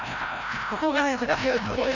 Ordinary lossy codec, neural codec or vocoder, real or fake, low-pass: none; codec, 16 kHz, 0.5 kbps, FreqCodec, larger model; fake; 7.2 kHz